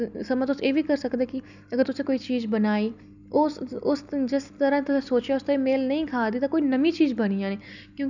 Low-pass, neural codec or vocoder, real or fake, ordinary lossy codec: 7.2 kHz; none; real; none